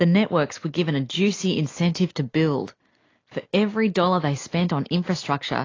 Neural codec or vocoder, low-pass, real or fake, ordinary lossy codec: none; 7.2 kHz; real; AAC, 32 kbps